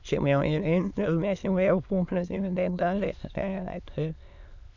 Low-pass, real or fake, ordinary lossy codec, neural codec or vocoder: 7.2 kHz; fake; none; autoencoder, 22.05 kHz, a latent of 192 numbers a frame, VITS, trained on many speakers